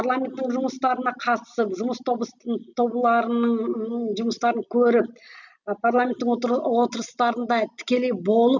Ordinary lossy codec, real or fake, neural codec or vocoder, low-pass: none; real; none; 7.2 kHz